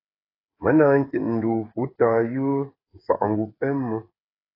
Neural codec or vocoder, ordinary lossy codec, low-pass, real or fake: codec, 16 kHz, 8 kbps, FreqCodec, smaller model; AAC, 24 kbps; 5.4 kHz; fake